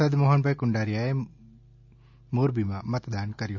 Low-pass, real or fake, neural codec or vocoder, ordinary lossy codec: 7.2 kHz; real; none; none